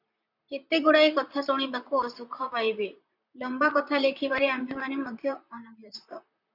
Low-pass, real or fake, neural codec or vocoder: 5.4 kHz; fake; vocoder, 44.1 kHz, 128 mel bands, Pupu-Vocoder